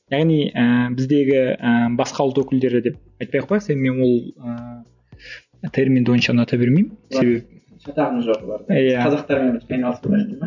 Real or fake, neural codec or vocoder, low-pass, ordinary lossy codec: real; none; 7.2 kHz; none